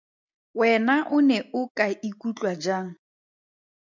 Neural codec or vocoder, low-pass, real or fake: none; 7.2 kHz; real